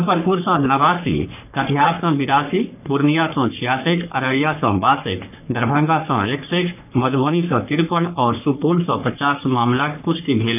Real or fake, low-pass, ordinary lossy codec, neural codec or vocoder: fake; 3.6 kHz; none; codec, 44.1 kHz, 3.4 kbps, Pupu-Codec